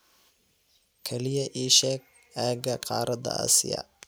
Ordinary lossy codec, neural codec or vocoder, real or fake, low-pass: none; none; real; none